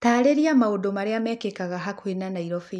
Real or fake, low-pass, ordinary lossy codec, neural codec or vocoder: real; none; none; none